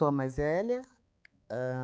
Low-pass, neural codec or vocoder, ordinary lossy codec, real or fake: none; codec, 16 kHz, 4 kbps, X-Codec, HuBERT features, trained on balanced general audio; none; fake